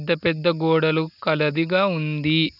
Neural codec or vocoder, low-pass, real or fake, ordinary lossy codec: none; 5.4 kHz; real; none